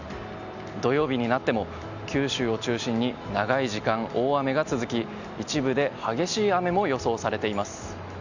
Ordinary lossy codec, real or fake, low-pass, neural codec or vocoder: none; real; 7.2 kHz; none